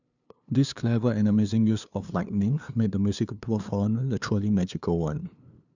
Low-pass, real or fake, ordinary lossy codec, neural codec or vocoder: 7.2 kHz; fake; none; codec, 16 kHz, 2 kbps, FunCodec, trained on LibriTTS, 25 frames a second